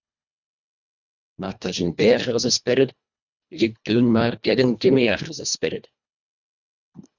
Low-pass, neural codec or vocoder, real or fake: 7.2 kHz; codec, 24 kHz, 1.5 kbps, HILCodec; fake